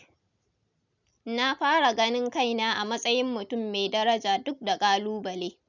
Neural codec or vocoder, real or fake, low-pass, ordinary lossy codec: none; real; 7.2 kHz; none